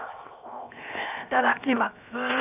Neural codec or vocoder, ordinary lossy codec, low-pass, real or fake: codec, 16 kHz, 0.7 kbps, FocalCodec; none; 3.6 kHz; fake